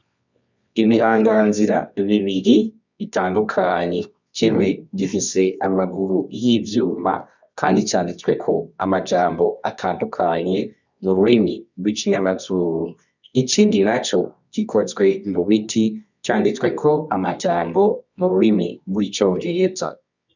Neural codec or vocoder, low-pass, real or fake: codec, 24 kHz, 0.9 kbps, WavTokenizer, medium music audio release; 7.2 kHz; fake